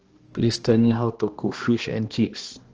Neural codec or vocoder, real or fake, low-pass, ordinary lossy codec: codec, 16 kHz, 1 kbps, X-Codec, HuBERT features, trained on balanced general audio; fake; 7.2 kHz; Opus, 16 kbps